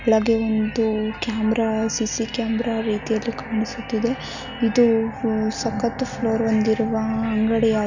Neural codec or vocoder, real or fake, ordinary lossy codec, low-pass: none; real; none; 7.2 kHz